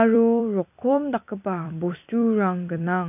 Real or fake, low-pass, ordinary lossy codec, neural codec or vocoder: fake; 3.6 kHz; none; vocoder, 44.1 kHz, 128 mel bands every 256 samples, BigVGAN v2